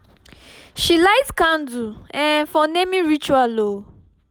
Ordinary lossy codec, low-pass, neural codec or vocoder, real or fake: none; none; none; real